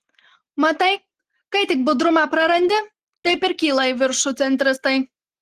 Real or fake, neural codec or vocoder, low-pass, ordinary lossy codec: real; none; 14.4 kHz; Opus, 16 kbps